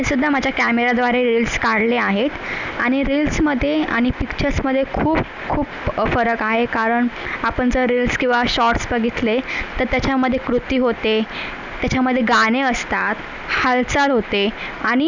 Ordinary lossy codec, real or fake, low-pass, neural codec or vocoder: none; real; 7.2 kHz; none